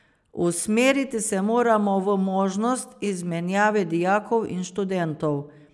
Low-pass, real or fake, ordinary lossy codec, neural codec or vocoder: none; real; none; none